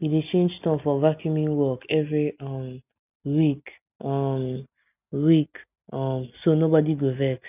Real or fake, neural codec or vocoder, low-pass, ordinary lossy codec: real; none; 3.6 kHz; none